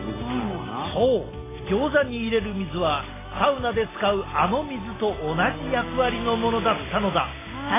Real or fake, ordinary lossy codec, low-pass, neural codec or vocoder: real; AAC, 16 kbps; 3.6 kHz; none